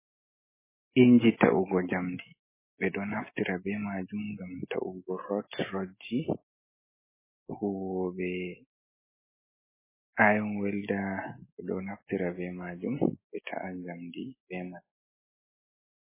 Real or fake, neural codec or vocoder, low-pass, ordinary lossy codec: real; none; 3.6 kHz; MP3, 16 kbps